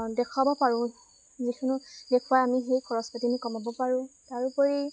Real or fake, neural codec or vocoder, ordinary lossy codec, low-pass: real; none; none; none